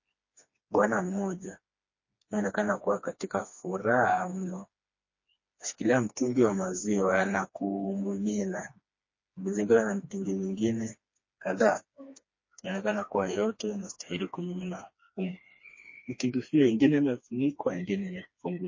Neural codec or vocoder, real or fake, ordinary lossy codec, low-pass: codec, 16 kHz, 2 kbps, FreqCodec, smaller model; fake; MP3, 32 kbps; 7.2 kHz